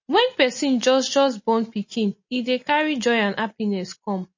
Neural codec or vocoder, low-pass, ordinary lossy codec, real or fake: none; 7.2 kHz; MP3, 32 kbps; real